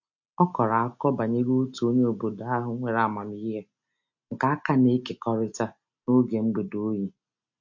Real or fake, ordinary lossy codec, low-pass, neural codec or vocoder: real; MP3, 48 kbps; 7.2 kHz; none